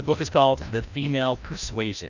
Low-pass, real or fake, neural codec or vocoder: 7.2 kHz; fake; codec, 16 kHz, 1 kbps, FreqCodec, larger model